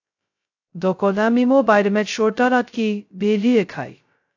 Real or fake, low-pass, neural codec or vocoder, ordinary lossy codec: fake; 7.2 kHz; codec, 16 kHz, 0.2 kbps, FocalCodec; AAC, 48 kbps